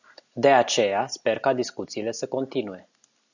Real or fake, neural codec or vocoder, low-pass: real; none; 7.2 kHz